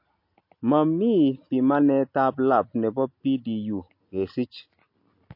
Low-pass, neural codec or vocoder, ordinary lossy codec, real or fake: 5.4 kHz; none; MP3, 32 kbps; real